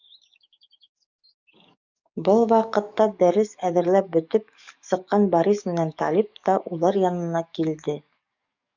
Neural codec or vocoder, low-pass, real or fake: codec, 44.1 kHz, 7.8 kbps, DAC; 7.2 kHz; fake